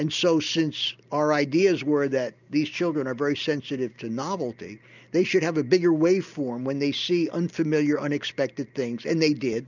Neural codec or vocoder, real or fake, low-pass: none; real; 7.2 kHz